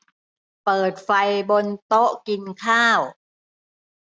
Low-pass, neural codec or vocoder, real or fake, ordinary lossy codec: none; none; real; none